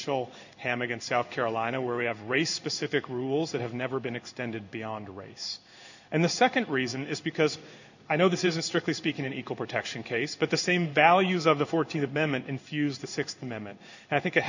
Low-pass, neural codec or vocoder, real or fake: 7.2 kHz; codec, 16 kHz in and 24 kHz out, 1 kbps, XY-Tokenizer; fake